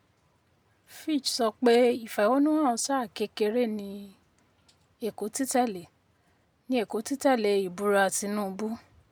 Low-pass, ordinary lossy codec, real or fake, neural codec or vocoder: none; none; real; none